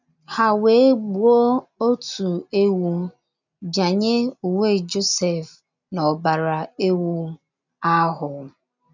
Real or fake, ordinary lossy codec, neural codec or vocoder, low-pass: real; none; none; 7.2 kHz